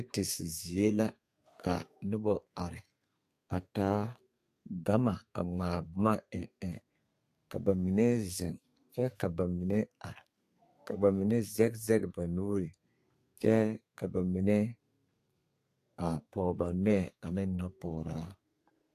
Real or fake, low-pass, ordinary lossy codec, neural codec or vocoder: fake; 14.4 kHz; AAC, 64 kbps; codec, 32 kHz, 1.9 kbps, SNAC